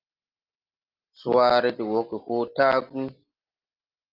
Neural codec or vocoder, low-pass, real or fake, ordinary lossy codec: none; 5.4 kHz; real; Opus, 24 kbps